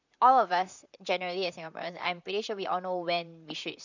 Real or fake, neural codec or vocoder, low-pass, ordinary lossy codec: fake; vocoder, 44.1 kHz, 128 mel bands, Pupu-Vocoder; 7.2 kHz; none